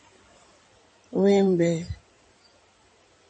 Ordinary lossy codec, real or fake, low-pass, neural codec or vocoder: MP3, 32 kbps; fake; 9.9 kHz; codec, 16 kHz in and 24 kHz out, 2.2 kbps, FireRedTTS-2 codec